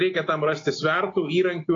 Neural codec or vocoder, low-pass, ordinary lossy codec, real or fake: none; 7.2 kHz; AAC, 32 kbps; real